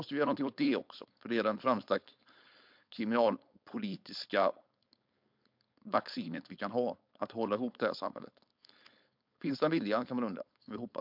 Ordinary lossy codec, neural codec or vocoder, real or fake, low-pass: MP3, 48 kbps; codec, 16 kHz, 4.8 kbps, FACodec; fake; 5.4 kHz